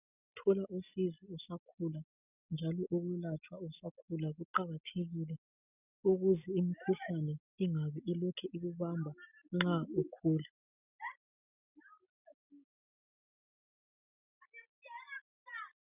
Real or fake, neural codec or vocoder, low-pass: real; none; 3.6 kHz